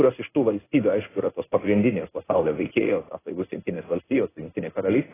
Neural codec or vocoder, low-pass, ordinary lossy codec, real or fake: codec, 16 kHz in and 24 kHz out, 1 kbps, XY-Tokenizer; 3.6 kHz; AAC, 16 kbps; fake